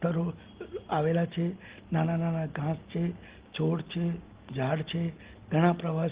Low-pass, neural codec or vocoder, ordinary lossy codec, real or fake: 3.6 kHz; none; Opus, 16 kbps; real